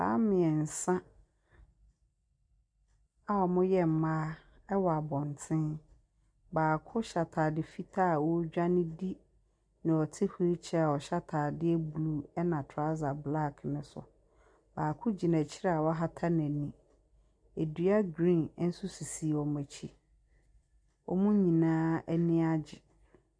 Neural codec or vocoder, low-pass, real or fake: none; 9.9 kHz; real